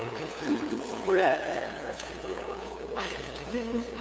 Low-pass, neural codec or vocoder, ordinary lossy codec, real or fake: none; codec, 16 kHz, 2 kbps, FunCodec, trained on LibriTTS, 25 frames a second; none; fake